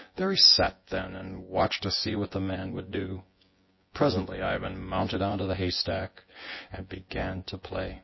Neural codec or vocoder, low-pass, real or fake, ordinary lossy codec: vocoder, 24 kHz, 100 mel bands, Vocos; 7.2 kHz; fake; MP3, 24 kbps